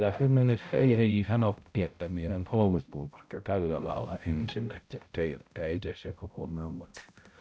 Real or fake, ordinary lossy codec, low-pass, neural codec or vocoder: fake; none; none; codec, 16 kHz, 0.5 kbps, X-Codec, HuBERT features, trained on balanced general audio